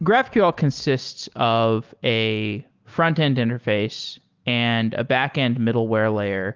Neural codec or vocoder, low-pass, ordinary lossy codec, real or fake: none; 7.2 kHz; Opus, 32 kbps; real